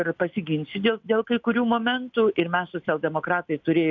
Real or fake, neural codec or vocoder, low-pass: real; none; 7.2 kHz